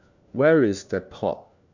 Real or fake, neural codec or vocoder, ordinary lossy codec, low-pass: fake; codec, 16 kHz, 1 kbps, FunCodec, trained on LibriTTS, 50 frames a second; none; 7.2 kHz